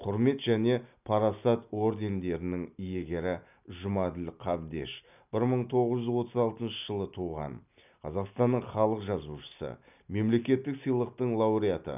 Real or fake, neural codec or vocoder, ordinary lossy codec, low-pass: real; none; none; 3.6 kHz